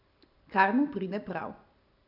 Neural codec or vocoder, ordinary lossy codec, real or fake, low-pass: none; AAC, 48 kbps; real; 5.4 kHz